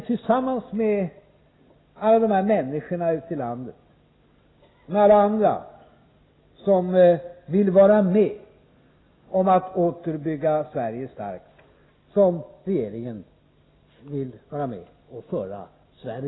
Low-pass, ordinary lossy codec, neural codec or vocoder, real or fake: 7.2 kHz; AAC, 16 kbps; none; real